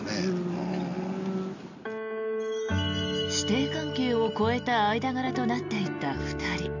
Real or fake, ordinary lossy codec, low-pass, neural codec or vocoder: real; none; 7.2 kHz; none